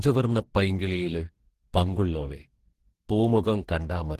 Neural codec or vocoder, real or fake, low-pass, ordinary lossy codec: codec, 44.1 kHz, 2.6 kbps, DAC; fake; 14.4 kHz; Opus, 16 kbps